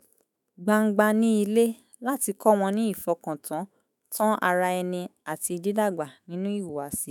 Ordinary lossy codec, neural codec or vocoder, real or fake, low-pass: none; autoencoder, 48 kHz, 128 numbers a frame, DAC-VAE, trained on Japanese speech; fake; 19.8 kHz